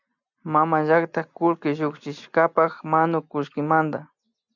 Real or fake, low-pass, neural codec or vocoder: real; 7.2 kHz; none